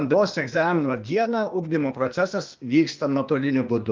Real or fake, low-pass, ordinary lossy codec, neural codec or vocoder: fake; 7.2 kHz; Opus, 24 kbps; codec, 16 kHz, 0.8 kbps, ZipCodec